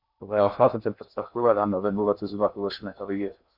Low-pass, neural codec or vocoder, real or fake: 5.4 kHz; codec, 16 kHz in and 24 kHz out, 0.6 kbps, FocalCodec, streaming, 2048 codes; fake